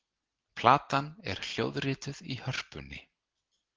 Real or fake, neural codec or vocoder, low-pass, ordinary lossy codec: real; none; 7.2 kHz; Opus, 16 kbps